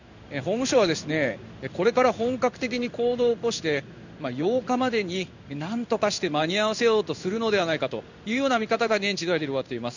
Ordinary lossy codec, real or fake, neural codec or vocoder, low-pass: none; fake; codec, 16 kHz in and 24 kHz out, 1 kbps, XY-Tokenizer; 7.2 kHz